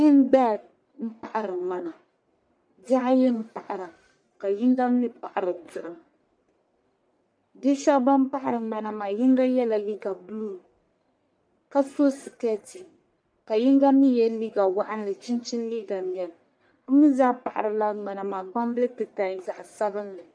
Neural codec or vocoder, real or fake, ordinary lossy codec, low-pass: codec, 44.1 kHz, 1.7 kbps, Pupu-Codec; fake; MP3, 64 kbps; 9.9 kHz